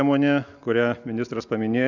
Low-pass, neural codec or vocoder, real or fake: 7.2 kHz; none; real